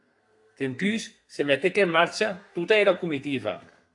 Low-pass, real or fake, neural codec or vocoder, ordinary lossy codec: 10.8 kHz; fake; codec, 32 kHz, 1.9 kbps, SNAC; AAC, 64 kbps